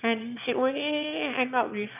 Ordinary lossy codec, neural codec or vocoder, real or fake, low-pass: none; autoencoder, 22.05 kHz, a latent of 192 numbers a frame, VITS, trained on one speaker; fake; 3.6 kHz